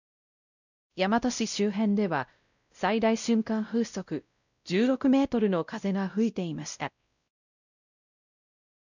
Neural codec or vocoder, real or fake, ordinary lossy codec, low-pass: codec, 16 kHz, 0.5 kbps, X-Codec, WavLM features, trained on Multilingual LibriSpeech; fake; none; 7.2 kHz